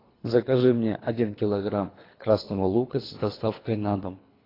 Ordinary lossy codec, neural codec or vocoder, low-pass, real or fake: AAC, 24 kbps; codec, 24 kHz, 3 kbps, HILCodec; 5.4 kHz; fake